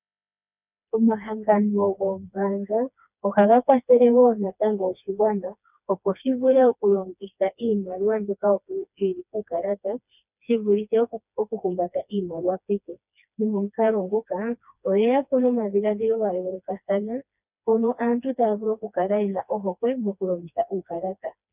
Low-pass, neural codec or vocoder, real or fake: 3.6 kHz; codec, 16 kHz, 2 kbps, FreqCodec, smaller model; fake